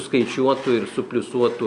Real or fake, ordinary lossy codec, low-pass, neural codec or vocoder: real; MP3, 96 kbps; 10.8 kHz; none